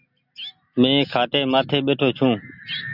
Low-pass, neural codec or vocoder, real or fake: 5.4 kHz; none; real